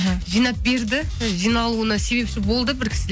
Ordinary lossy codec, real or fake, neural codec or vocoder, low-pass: none; real; none; none